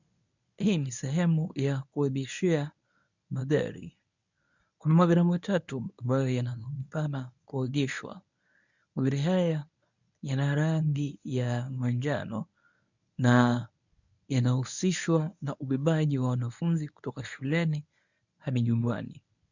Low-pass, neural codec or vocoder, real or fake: 7.2 kHz; codec, 24 kHz, 0.9 kbps, WavTokenizer, medium speech release version 1; fake